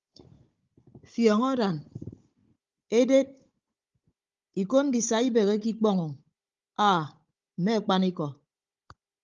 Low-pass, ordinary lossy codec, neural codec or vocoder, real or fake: 7.2 kHz; Opus, 32 kbps; codec, 16 kHz, 16 kbps, FunCodec, trained on Chinese and English, 50 frames a second; fake